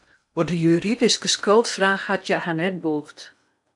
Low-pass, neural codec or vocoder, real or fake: 10.8 kHz; codec, 16 kHz in and 24 kHz out, 0.8 kbps, FocalCodec, streaming, 65536 codes; fake